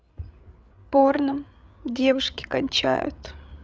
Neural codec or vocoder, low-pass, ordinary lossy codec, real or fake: codec, 16 kHz, 8 kbps, FreqCodec, larger model; none; none; fake